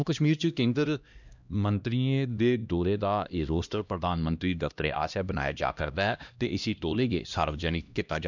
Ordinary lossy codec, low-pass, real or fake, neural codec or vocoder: none; 7.2 kHz; fake; codec, 16 kHz, 1 kbps, X-Codec, HuBERT features, trained on LibriSpeech